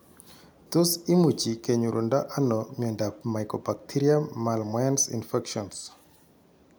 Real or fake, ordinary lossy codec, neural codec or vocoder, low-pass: real; none; none; none